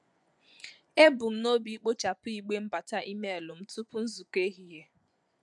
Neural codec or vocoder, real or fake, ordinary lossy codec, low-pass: vocoder, 24 kHz, 100 mel bands, Vocos; fake; none; 10.8 kHz